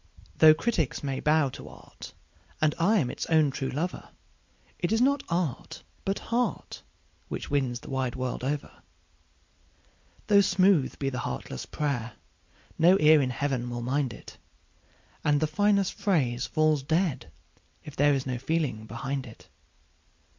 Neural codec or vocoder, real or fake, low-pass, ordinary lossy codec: none; real; 7.2 kHz; MP3, 48 kbps